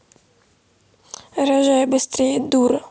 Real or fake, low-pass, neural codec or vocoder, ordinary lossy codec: real; none; none; none